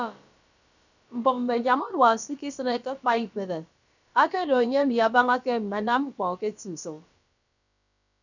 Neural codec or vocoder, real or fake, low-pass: codec, 16 kHz, about 1 kbps, DyCAST, with the encoder's durations; fake; 7.2 kHz